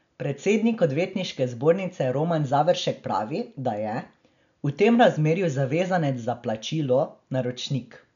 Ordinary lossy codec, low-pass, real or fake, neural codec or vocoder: none; 7.2 kHz; real; none